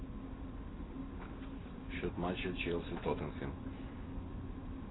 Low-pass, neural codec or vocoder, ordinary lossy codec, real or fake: 7.2 kHz; none; AAC, 16 kbps; real